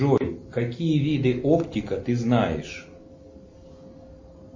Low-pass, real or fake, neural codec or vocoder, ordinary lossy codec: 7.2 kHz; real; none; MP3, 32 kbps